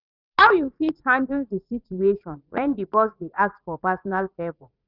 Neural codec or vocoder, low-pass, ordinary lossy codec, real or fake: vocoder, 44.1 kHz, 80 mel bands, Vocos; 5.4 kHz; none; fake